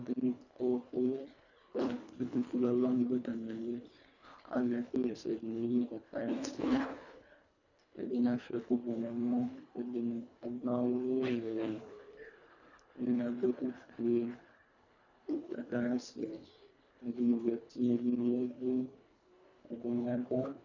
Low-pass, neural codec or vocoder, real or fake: 7.2 kHz; codec, 24 kHz, 1.5 kbps, HILCodec; fake